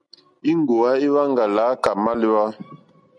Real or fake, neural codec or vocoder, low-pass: real; none; 9.9 kHz